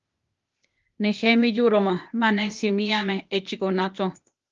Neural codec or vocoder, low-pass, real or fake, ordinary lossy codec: codec, 16 kHz, 0.8 kbps, ZipCodec; 7.2 kHz; fake; Opus, 32 kbps